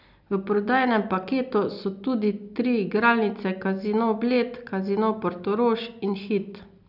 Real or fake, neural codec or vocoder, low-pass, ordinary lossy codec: real; none; 5.4 kHz; none